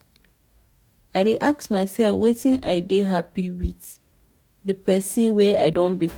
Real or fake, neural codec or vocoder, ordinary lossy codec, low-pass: fake; codec, 44.1 kHz, 2.6 kbps, DAC; MP3, 96 kbps; 19.8 kHz